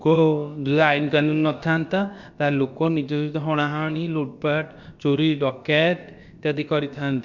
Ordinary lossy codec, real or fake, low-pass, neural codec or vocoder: Opus, 64 kbps; fake; 7.2 kHz; codec, 16 kHz, about 1 kbps, DyCAST, with the encoder's durations